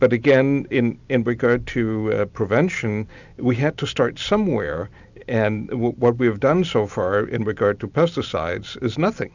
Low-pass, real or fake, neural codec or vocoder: 7.2 kHz; real; none